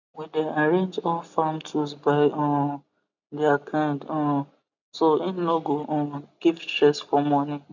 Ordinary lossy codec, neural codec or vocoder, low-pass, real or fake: none; none; 7.2 kHz; real